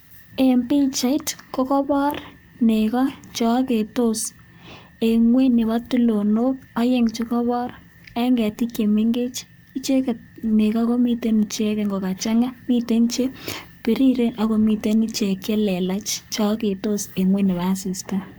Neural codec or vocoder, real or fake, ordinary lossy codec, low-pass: codec, 44.1 kHz, 7.8 kbps, Pupu-Codec; fake; none; none